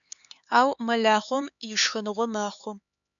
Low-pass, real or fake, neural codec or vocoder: 7.2 kHz; fake; codec, 16 kHz, 4 kbps, X-Codec, HuBERT features, trained on LibriSpeech